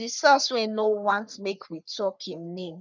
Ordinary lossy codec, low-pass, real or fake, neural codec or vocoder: none; 7.2 kHz; fake; codec, 44.1 kHz, 3.4 kbps, Pupu-Codec